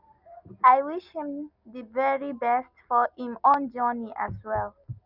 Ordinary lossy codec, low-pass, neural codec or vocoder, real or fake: none; 5.4 kHz; none; real